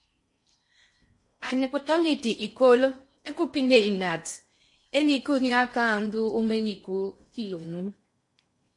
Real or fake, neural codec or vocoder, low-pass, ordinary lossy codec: fake; codec, 16 kHz in and 24 kHz out, 0.6 kbps, FocalCodec, streaming, 4096 codes; 10.8 kHz; MP3, 48 kbps